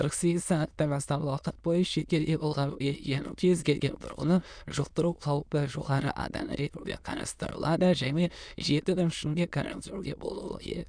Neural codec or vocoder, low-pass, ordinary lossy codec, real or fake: autoencoder, 22.05 kHz, a latent of 192 numbers a frame, VITS, trained on many speakers; 9.9 kHz; none; fake